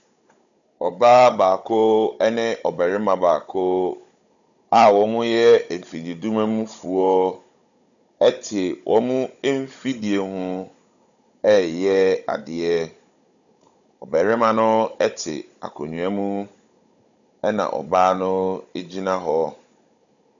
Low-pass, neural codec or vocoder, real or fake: 7.2 kHz; codec, 16 kHz, 16 kbps, FunCodec, trained on Chinese and English, 50 frames a second; fake